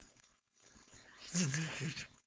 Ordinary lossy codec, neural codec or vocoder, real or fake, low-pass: none; codec, 16 kHz, 4.8 kbps, FACodec; fake; none